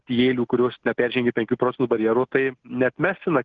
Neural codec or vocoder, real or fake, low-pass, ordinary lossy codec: none; real; 7.2 kHz; Opus, 16 kbps